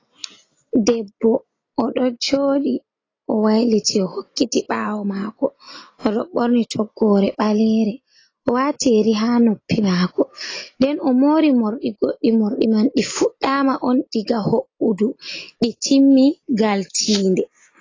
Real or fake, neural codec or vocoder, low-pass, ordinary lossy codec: real; none; 7.2 kHz; AAC, 32 kbps